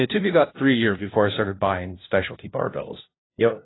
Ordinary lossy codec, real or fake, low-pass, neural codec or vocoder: AAC, 16 kbps; fake; 7.2 kHz; codec, 16 kHz, 0.5 kbps, FunCodec, trained on LibriTTS, 25 frames a second